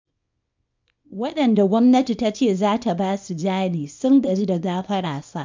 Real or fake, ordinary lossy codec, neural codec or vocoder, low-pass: fake; MP3, 64 kbps; codec, 24 kHz, 0.9 kbps, WavTokenizer, small release; 7.2 kHz